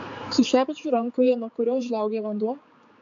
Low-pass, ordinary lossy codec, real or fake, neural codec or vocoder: 7.2 kHz; AAC, 64 kbps; fake; codec, 16 kHz, 4 kbps, X-Codec, HuBERT features, trained on general audio